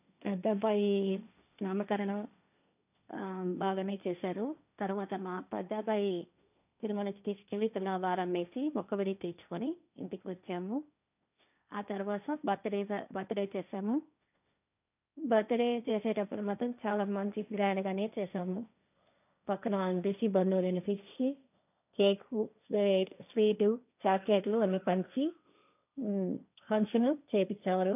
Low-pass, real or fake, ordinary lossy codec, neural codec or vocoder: 3.6 kHz; fake; none; codec, 16 kHz, 1.1 kbps, Voila-Tokenizer